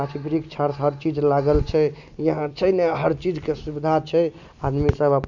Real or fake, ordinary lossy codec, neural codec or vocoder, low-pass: real; none; none; 7.2 kHz